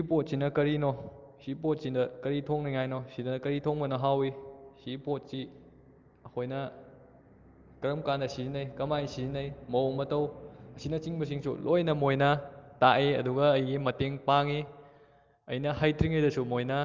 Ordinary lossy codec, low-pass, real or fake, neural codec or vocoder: Opus, 32 kbps; 7.2 kHz; real; none